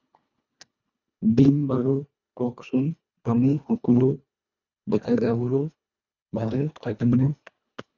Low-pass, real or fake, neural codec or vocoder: 7.2 kHz; fake; codec, 24 kHz, 1.5 kbps, HILCodec